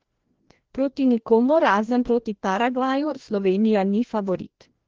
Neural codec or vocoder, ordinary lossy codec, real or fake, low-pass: codec, 16 kHz, 1 kbps, FreqCodec, larger model; Opus, 16 kbps; fake; 7.2 kHz